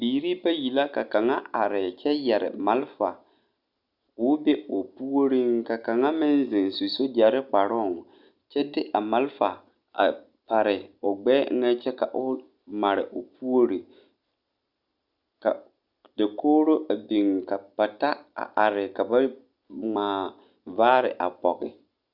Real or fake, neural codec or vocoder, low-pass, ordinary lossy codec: real; none; 5.4 kHz; Opus, 64 kbps